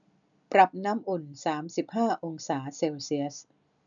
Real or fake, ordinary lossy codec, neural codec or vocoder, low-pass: real; none; none; 7.2 kHz